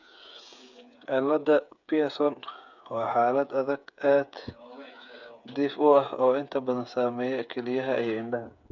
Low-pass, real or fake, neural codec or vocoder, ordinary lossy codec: 7.2 kHz; fake; codec, 16 kHz, 8 kbps, FreqCodec, smaller model; none